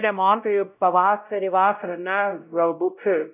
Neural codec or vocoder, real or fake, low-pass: codec, 16 kHz, 0.5 kbps, X-Codec, WavLM features, trained on Multilingual LibriSpeech; fake; 3.6 kHz